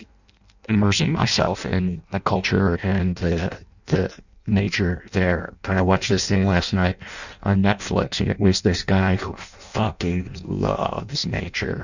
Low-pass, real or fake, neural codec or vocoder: 7.2 kHz; fake; codec, 16 kHz in and 24 kHz out, 0.6 kbps, FireRedTTS-2 codec